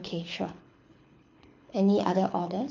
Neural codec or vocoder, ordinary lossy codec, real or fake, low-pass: codec, 24 kHz, 6 kbps, HILCodec; MP3, 64 kbps; fake; 7.2 kHz